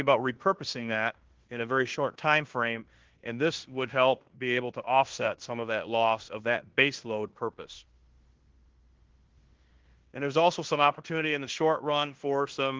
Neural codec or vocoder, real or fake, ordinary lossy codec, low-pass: codec, 16 kHz in and 24 kHz out, 0.9 kbps, LongCat-Audio-Codec, fine tuned four codebook decoder; fake; Opus, 16 kbps; 7.2 kHz